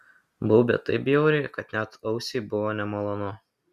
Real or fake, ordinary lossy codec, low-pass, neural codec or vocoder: real; Opus, 64 kbps; 14.4 kHz; none